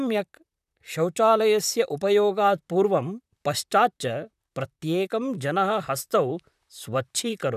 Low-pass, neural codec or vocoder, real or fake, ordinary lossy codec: 14.4 kHz; vocoder, 44.1 kHz, 128 mel bands, Pupu-Vocoder; fake; none